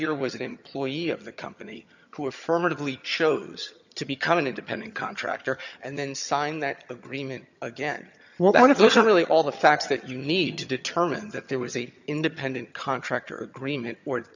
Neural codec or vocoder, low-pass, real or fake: vocoder, 22.05 kHz, 80 mel bands, HiFi-GAN; 7.2 kHz; fake